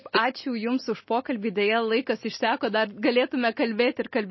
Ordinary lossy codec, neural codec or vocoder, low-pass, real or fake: MP3, 24 kbps; none; 7.2 kHz; real